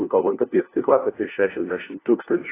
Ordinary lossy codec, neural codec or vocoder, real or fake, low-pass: AAC, 16 kbps; codec, 16 kHz, 1 kbps, FunCodec, trained on LibriTTS, 50 frames a second; fake; 3.6 kHz